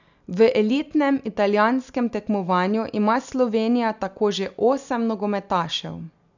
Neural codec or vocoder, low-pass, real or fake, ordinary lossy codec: none; 7.2 kHz; real; none